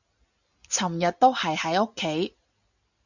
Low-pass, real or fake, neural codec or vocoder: 7.2 kHz; real; none